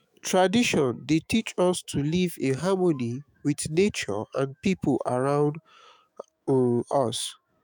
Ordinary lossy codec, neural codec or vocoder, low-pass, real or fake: none; autoencoder, 48 kHz, 128 numbers a frame, DAC-VAE, trained on Japanese speech; none; fake